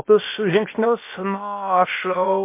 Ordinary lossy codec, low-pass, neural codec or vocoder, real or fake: MP3, 24 kbps; 3.6 kHz; codec, 16 kHz, about 1 kbps, DyCAST, with the encoder's durations; fake